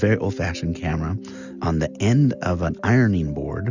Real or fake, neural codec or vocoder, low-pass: real; none; 7.2 kHz